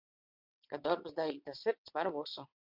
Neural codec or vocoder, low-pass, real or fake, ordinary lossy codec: vocoder, 22.05 kHz, 80 mel bands, WaveNeXt; 5.4 kHz; fake; MP3, 48 kbps